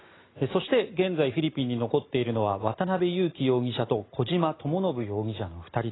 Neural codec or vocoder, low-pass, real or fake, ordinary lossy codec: none; 7.2 kHz; real; AAC, 16 kbps